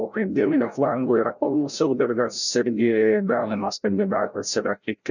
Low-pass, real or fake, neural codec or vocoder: 7.2 kHz; fake; codec, 16 kHz, 0.5 kbps, FreqCodec, larger model